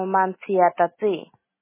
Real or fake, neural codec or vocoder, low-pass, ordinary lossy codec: real; none; 3.6 kHz; MP3, 16 kbps